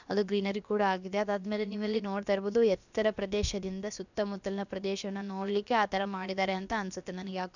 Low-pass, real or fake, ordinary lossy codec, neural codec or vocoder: 7.2 kHz; fake; none; codec, 16 kHz, about 1 kbps, DyCAST, with the encoder's durations